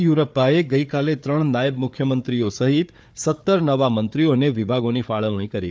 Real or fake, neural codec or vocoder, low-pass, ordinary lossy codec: fake; codec, 16 kHz, 4 kbps, FunCodec, trained on Chinese and English, 50 frames a second; none; none